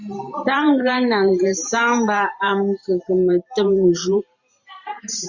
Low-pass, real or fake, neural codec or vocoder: 7.2 kHz; fake; vocoder, 44.1 kHz, 128 mel bands every 512 samples, BigVGAN v2